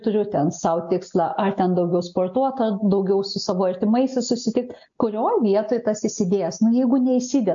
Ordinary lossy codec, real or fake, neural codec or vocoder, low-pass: AAC, 64 kbps; real; none; 7.2 kHz